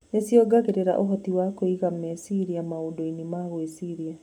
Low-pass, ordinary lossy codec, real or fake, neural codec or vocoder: 19.8 kHz; none; real; none